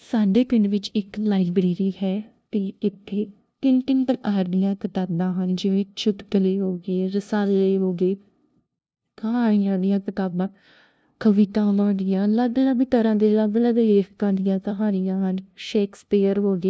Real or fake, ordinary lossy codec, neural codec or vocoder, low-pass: fake; none; codec, 16 kHz, 0.5 kbps, FunCodec, trained on LibriTTS, 25 frames a second; none